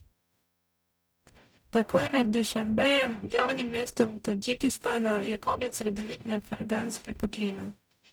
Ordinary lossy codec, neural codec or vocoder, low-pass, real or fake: none; codec, 44.1 kHz, 0.9 kbps, DAC; none; fake